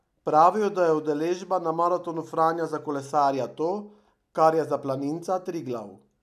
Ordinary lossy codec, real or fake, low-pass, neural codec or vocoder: none; real; 14.4 kHz; none